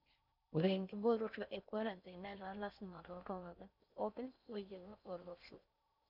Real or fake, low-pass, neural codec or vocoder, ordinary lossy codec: fake; 5.4 kHz; codec, 16 kHz in and 24 kHz out, 0.6 kbps, FocalCodec, streaming, 4096 codes; none